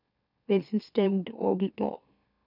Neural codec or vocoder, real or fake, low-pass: autoencoder, 44.1 kHz, a latent of 192 numbers a frame, MeloTTS; fake; 5.4 kHz